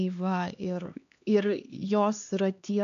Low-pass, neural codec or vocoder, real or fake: 7.2 kHz; codec, 16 kHz, 2 kbps, X-Codec, HuBERT features, trained on LibriSpeech; fake